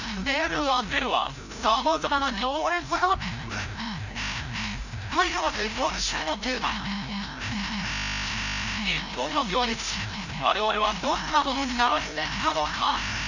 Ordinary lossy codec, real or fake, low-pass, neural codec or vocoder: none; fake; 7.2 kHz; codec, 16 kHz, 0.5 kbps, FreqCodec, larger model